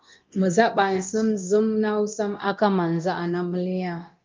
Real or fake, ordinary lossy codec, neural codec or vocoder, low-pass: fake; Opus, 24 kbps; codec, 24 kHz, 0.9 kbps, DualCodec; 7.2 kHz